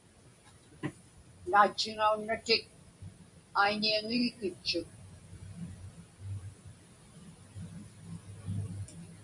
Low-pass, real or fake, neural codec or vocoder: 10.8 kHz; real; none